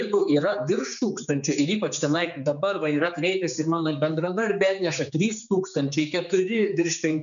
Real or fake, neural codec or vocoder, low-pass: fake; codec, 16 kHz, 4 kbps, X-Codec, HuBERT features, trained on general audio; 7.2 kHz